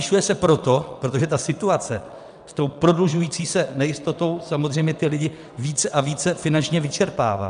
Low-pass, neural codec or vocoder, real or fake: 9.9 kHz; vocoder, 22.05 kHz, 80 mel bands, WaveNeXt; fake